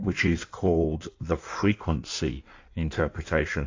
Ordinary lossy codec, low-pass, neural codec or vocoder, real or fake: AAC, 48 kbps; 7.2 kHz; codec, 16 kHz in and 24 kHz out, 1.1 kbps, FireRedTTS-2 codec; fake